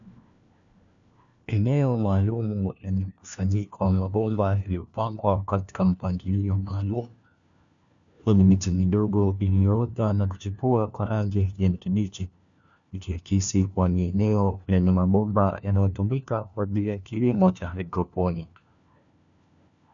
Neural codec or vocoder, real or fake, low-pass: codec, 16 kHz, 1 kbps, FunCodec, trained on LibriTTS, 50 frames a second; fake; 7.2 kHz